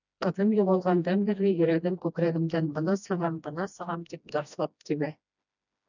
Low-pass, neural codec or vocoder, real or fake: 7.2 kHz; codec, 16 kHz, 1 kbps, FreqCodec, smaller model; fake